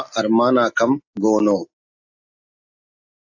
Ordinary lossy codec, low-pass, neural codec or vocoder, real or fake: AAC, 48 kbps; 7.2 kHz; none; real